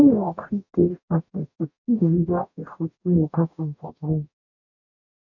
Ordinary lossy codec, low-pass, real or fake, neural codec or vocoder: none; 7.2 kHz; fake; codec, 44.1 kHz, 0.9 kbps, DAC